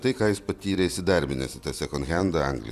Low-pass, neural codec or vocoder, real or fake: 14.4 kHz; vocoder, 44.1 kHz, 128 mel bands every 256 samples, BigVGAN v2; fake